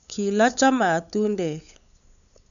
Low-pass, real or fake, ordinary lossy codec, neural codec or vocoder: 7.2 kHz; fake; none; codec, 16 kHz, 8 kbps, FunCodec, trained on LibriTTS, 25 frames a second